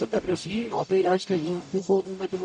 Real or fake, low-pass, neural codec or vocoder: fake; 10.8 kHz; codec, 44.1 kHz, 0.9 kbps, DAC